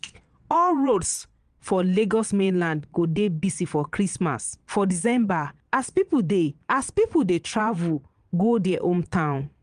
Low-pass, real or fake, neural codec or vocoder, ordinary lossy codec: 9.9 kHz; fake; vocoder, 22.05 kHz, 80 mel bands, Vocos; Opus, 32 kbps